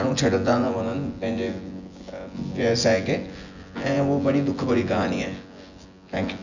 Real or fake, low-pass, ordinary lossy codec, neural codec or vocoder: fake; 7.2 kHz; none; vocoder, 24 kHz, 100 mel bands, Vocos